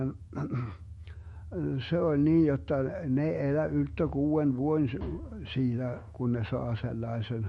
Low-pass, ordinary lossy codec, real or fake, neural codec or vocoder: 19.8 kHz; MP3, 48 kbps; fake; autoencoder, 48 kHz, 128 numbers a frame, DAC-VAE, trained on Japanese speech